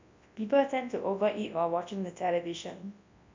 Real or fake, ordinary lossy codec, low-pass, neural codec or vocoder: fake; MP3, 64 kbps; 7.2 kHz; codec, 24 kHz, 0.9 kbps, WavTokenizer, large speech release